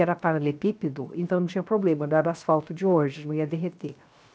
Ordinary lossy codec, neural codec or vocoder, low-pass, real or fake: none; codec, 16 kHz, 0.7 kbps, FocalCodec; none; fake